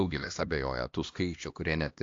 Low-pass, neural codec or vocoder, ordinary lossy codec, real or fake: 7.2 kHz; codec, 16 kHz, 1 kbps, X-Codec, HuBERT features, trained on LibriSpeech; AAC, 48 kbps; fake